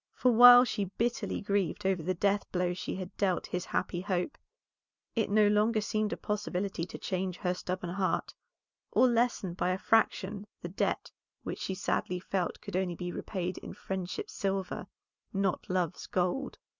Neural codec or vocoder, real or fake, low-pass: none; real; 7.2 kHz